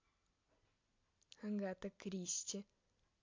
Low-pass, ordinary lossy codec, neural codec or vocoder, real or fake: 7.2 kHz; MP3, 48 kbps; none; real